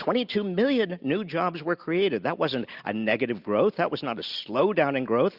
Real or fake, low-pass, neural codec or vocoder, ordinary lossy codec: real; 5.4 kHz; none; Opus, 64 kbps